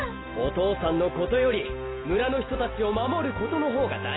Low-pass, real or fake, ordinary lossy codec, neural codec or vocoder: 7.2 kHz; real; AAC, 16 kbps; none